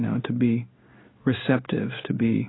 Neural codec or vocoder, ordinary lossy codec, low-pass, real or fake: codec, 16 kHz in and 24 kHz out, 1 kbps, XY-Tokenizer; AAC, 16 kbps; 7.2 kHz; fake